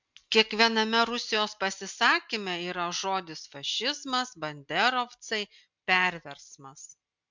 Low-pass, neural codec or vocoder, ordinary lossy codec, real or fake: 7.2 kHz; none; MP3, 64 kbps; real